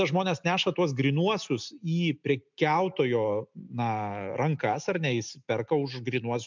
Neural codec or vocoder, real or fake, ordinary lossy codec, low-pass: none; real; MP3, 64 kbps; 7.2 kHz